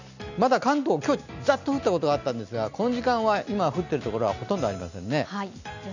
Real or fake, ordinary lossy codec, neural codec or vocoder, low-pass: real; none; none; 7.2 kHz